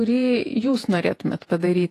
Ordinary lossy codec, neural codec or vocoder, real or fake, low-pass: AAC, 48 kbps; vocoder, 48 kHz, 128 mel bands, Vocos; fake; 14.4 kHz